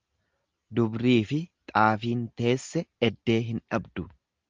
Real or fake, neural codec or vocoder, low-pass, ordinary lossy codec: real; none; 7.2 kHz; Opus, 24 kbps